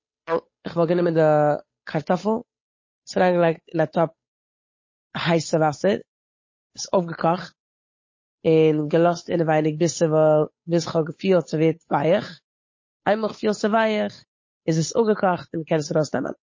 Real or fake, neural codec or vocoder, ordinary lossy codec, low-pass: fake; codec, 16 kHz, 8 kbps, FunCodec, trained on Chinese and English, 25 frames a second; MP3, 32 kbps; 7.2 kHz